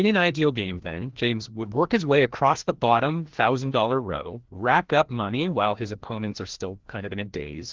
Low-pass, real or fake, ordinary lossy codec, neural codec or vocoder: 7.2 kHz; fake; Opus, 16 kbps; codec, 16 kHz, 1 kbps, FreqCodec, larger model